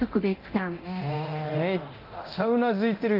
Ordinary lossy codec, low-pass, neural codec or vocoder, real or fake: Opus, 24 kbps; 5.4 kHz; codec, 24 kHz, 0.9 kbps, DualCodec; fake